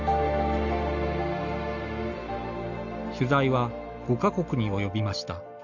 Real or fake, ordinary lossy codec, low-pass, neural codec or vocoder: real; none; 7.2 kHz; none